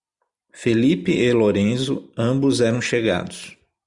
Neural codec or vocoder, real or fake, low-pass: none; real; 10.8 kHz